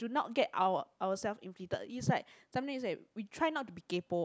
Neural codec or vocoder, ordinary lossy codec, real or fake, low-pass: none; none; real; none